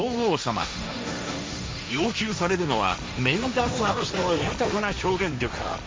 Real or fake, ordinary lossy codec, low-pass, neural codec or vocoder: fake; none; none; codec, 16 kHz, 1.1 kbps, Voila-Tokenizer